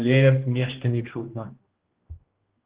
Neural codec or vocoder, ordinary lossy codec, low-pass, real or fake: codec, 16 kHz, 1 kbps, X-Codec, HuBERT features, trained on general audio; Opus, 16 kbps; 3.6 kHz; fake